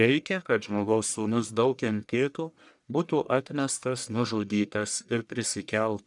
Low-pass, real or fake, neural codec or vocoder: 10.8 kHz; fake; codec, 44.1 kHz, 1.7 kbps, Pupu-Codec